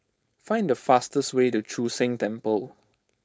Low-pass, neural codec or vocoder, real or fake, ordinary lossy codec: none; codec, 16 kHz, 4.8 kbps, FACodec; fake; none